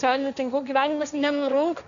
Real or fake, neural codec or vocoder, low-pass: fake; codec, 16 kHz, 1.1 kbps, Voila-Tokenizer; 7.2 kHz